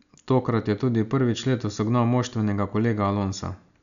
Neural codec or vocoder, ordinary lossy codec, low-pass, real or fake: none; none; 7.2 kHz; real